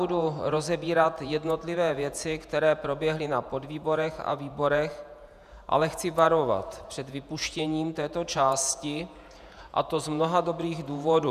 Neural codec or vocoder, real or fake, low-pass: vocoder, 48 kHz, 128 mel bands, Vocos; fake; 14.4 kHz